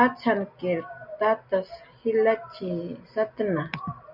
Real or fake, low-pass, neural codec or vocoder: real; 5.4 kHz; none